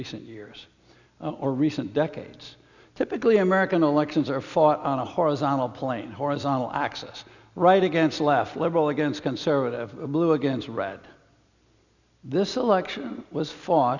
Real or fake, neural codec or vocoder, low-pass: real; none; 7.2 kHz